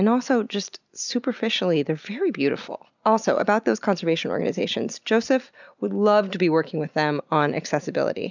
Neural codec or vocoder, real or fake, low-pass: none; real; 7.2 kHz